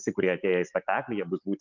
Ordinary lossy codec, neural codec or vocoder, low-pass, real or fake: MP3, 64 kbps; none; 7.2 kHz; real